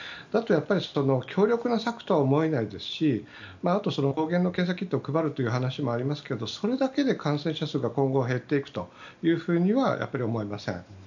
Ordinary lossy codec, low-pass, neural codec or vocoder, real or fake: none; 7.2 kHz; none; real